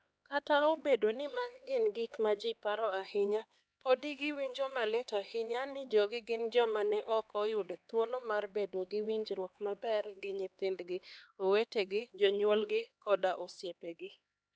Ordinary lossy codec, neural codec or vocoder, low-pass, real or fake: none; codec, 16 kHz, 2 kbps, X-Codec, HuBERT features, trained on LibriSpeech; none; fake